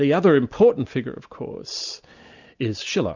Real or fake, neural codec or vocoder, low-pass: real; none; 7.2 kHz